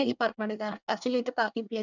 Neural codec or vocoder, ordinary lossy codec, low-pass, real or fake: codec, 24 kHz, 1 kbps, SNAC; none; 7.2 kHz; fake